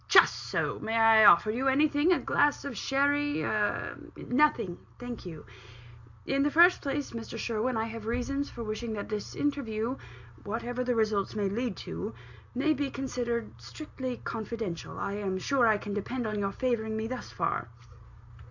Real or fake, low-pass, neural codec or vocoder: real; 7.2 kHz; none